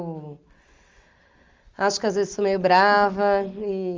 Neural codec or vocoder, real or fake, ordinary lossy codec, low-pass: none; real; Opus, 32 kbps; 7.2 kHz